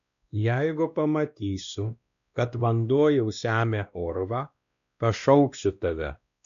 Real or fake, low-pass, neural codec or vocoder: fake; 7.2 kHz; codec, 16 kHz, 1 kbps, X-Codec, WavLM features, trained on Multilingual LibriSpeech